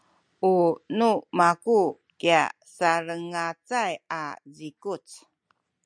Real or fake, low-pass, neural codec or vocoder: real; 9.9 kHz; none